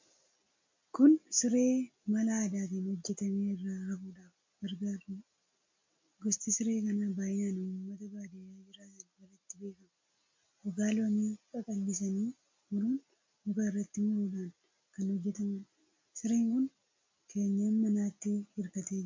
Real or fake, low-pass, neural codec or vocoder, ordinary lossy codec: real; 7.2 kHz; none; MP3, 48 kbps